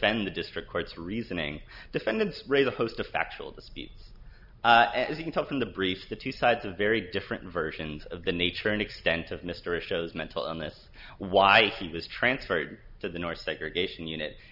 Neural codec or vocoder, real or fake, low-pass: none; real; 5.4 kHz